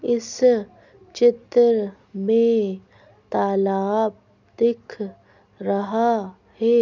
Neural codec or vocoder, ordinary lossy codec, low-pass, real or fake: none; none; 7.2 kHz; real